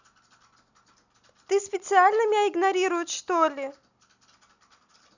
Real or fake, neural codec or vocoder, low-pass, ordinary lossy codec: real; none; 7.2 kHz; none